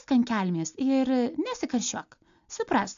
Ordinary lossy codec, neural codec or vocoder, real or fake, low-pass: AAC, 64 kbps; none; real; 7.2 kHz